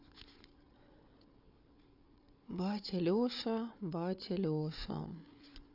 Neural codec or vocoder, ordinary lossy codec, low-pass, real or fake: codec, 16 kHz, 8 kbps, FreqCodec, larger model; none; 5.4 kHz; fake